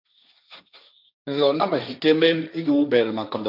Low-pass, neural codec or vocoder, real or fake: 5.4 kHz; codec, 16 kHz, 1.1 kbps, Voila-Tokenizer; fake